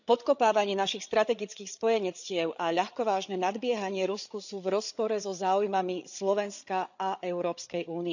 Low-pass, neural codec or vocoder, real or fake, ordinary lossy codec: 7.2 kHz; codec, 16 kHz, 8 kbps, FreqCodec, larger model; fake; none